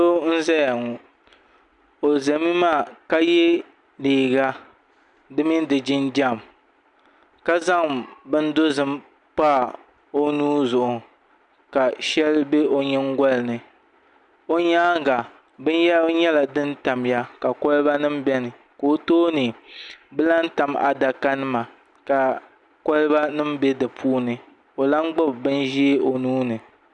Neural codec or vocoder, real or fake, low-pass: none; real; 10.8 kHz